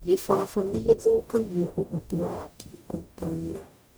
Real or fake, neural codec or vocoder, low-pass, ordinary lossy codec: fake; codec, 44.1 kHz, 0.9 kbps, DAC; none; none